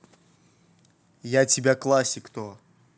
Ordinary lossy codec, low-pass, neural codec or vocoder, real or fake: none; none; none; real